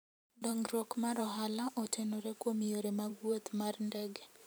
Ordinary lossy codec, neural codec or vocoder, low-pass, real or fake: none; vocoder, 44.1 kHz, 128 mel bands every 512 samples, BigVGAN v2; none; fake